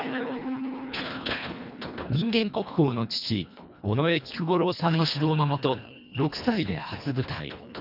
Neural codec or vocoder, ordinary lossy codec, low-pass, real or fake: codec, 24 kHz, 1.5 kbps, HILCodec; none; 5.4 kHz; fake